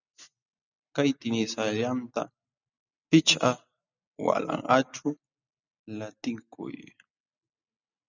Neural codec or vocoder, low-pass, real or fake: none; 7.2 kHz; real